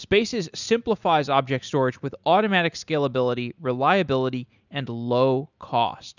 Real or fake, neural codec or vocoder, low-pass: real; none; 7.2 kHz